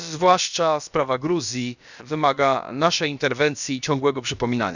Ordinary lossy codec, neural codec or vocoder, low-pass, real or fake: none; codec, 16 kHz, about 1 kbps, DyCAST, with the encoder's durations; 7.2 kHz; fake